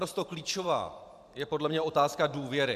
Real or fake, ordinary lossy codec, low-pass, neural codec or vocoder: fake; MP3, 96 kbps; 14.4 kHz; vocoder, 44.1 kHz, 128 mel bands every 512 samples, BigVGAN v2